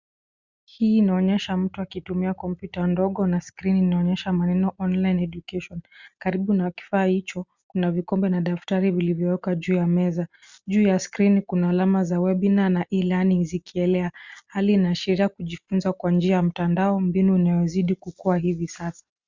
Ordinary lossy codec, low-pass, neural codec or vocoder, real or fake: Opus, 64 kbps; 7.2 kHz; none; real